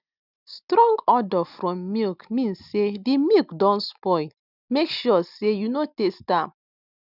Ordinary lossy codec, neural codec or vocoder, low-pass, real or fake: none; none; 5.4 kHz; real